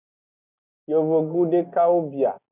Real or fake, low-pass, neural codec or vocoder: real; 3.6 kHz; none